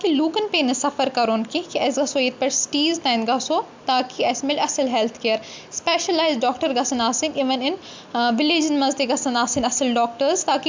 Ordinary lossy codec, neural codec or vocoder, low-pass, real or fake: MP3, 64 kbps; none; 7.2 kHz; real